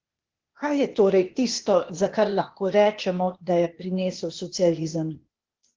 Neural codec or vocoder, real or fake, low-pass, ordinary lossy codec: codec, 16 kHz, 0.8 kbps, ZipCodec; fake; 7.2 kHz; Opus, 16 kbps